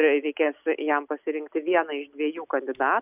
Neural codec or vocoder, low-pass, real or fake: none; 3.6 kHz; real